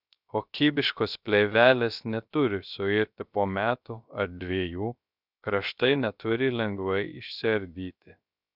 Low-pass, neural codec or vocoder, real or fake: 5.4 kHz; codec, 16 kHz, 0.3 kbps, FocalCodec; fake